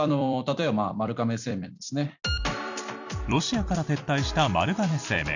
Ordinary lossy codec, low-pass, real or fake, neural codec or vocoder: none; 7.2 kHz; fake; vocoder, 44.1 kHz, 128 mel bands every 256 samples, BigVGAN v2